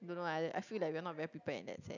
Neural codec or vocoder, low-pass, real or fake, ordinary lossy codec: none; 7.2 kHz; real; none